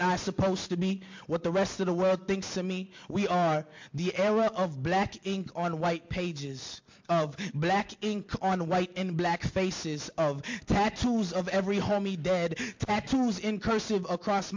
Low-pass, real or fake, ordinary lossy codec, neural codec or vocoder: 7.2 kHz; real; MP3, 48 kbps; none